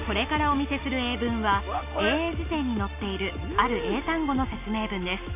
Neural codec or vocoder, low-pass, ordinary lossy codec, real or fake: none; 3.6 kHz; none; real